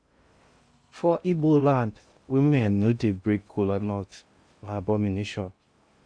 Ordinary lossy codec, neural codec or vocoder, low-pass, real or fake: Opus, 64 kbps; codec, 16 kHz in and 24 kHz out, 0.6 kbps, FocalCodec, streaming, 2048 codes; 9.9 kHz; fake